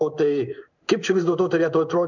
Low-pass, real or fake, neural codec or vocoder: 7.2 kHz; fake; codec, 16 kHz in and 24 kHz out, 1 kbps, XY-Tokenizer